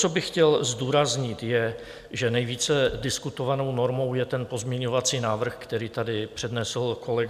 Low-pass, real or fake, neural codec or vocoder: 14.4 kHz; real; none